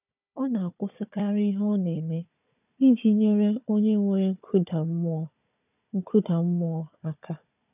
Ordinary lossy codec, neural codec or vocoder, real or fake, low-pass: none; codec, 16 kHz, 4 kbps, FunCodec, trained on Chinese and English, 50 frames a second; fake; 3.6 kHz